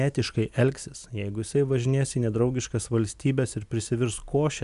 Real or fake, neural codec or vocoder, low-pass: real; none; 10.8 kHz